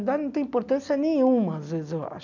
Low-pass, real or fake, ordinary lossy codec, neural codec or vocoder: 7.2 kHz; real; none; none